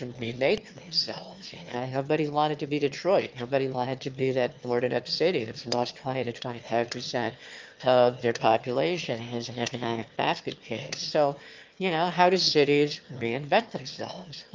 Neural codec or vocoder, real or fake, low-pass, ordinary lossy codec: autoencoder, 22.05 kHz, a latent of 192 numbers a frame, VITS, trained on one speaker; fake; 7.2 kHz; Opus, 24 kbps